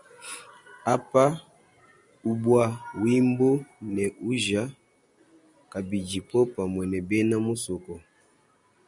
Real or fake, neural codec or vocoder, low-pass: real; none; 10.8 kHz